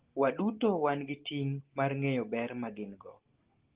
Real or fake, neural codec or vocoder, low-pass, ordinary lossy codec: real; none; 3.6 kHz; Opus, 16 kbps